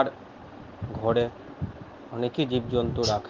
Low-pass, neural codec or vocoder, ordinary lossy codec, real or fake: 7.2 kHz; none; Opus, 16 kbps; real